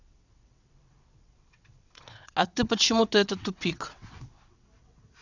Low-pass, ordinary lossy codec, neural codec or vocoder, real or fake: 7.2 kHz; none; vocoder, 22.05 kHz, 80 mel bands, WaveNeXt; fake